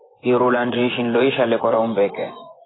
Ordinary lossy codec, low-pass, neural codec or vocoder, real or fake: AAC, 16 kbps; 7.2 kHz; vocoder, 24 kHz, 100 mel bands, Vocos; fake